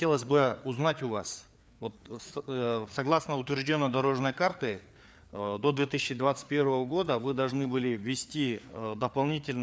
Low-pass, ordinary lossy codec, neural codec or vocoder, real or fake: none; none; codec, 16 kHz, 4 kbps, FreqCodec, larger model; fake